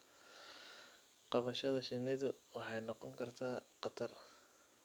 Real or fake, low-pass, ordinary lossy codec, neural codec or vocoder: fake; none; none; codec, 44.1 kHz, 7.8 kbps, DAC